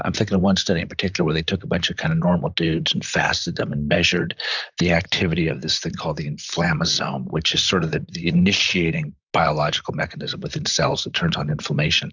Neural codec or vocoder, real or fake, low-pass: vocoder, 44.1 kHz, 128 mel bands, Pupu-Vocoder; fake; 7.2 kHz